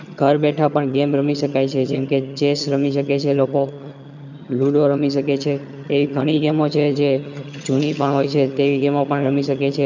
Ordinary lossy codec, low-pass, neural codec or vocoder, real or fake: none; 7.2 kHz; vocoder, 22.05 kHz, 80 mel bands, HiFi-GAN; fake